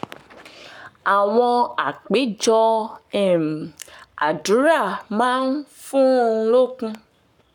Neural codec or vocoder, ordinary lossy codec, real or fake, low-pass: codec, 44.1 kHz, 7.8 kbps, Pupu-Codec; none; fake; 19.8 kHz